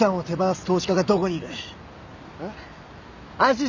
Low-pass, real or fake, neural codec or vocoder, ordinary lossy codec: 7.2 kHz; real; none; none